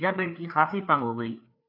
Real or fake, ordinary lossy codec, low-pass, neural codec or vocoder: fake; AAC, 32 kbps; 5.4 kHz; codec, 16 kHz, 4 kbps, FreqCodec, larger model